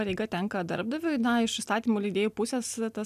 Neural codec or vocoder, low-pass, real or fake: none; 14.4 kHz; real